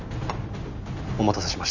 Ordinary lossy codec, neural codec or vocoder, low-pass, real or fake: none; none; 7.2 kHz; real